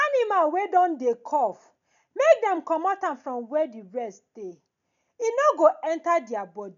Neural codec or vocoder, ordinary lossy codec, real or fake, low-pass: none; none; real; 7.2 kHz